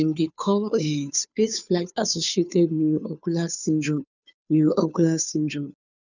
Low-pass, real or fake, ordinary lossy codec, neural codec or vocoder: 7.2 kHz; fake; none; codec, 16 kHz, 2 kbps, FunCodec, trained on Chinese and English, 25 frames a second